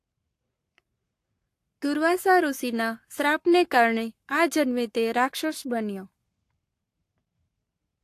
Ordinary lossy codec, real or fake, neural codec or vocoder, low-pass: AAC, 64 kbps; fake; codec, 44.1 kHz, 7.8 kbps, Pupu-Codec; 14.4 kHz